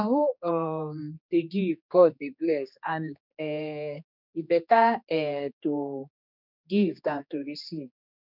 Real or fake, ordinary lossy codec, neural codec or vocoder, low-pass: fake; none; codec, 16 kHz, 2 kbps, X-Codec, HuBERT features, trained on general audio; 5.4 kHz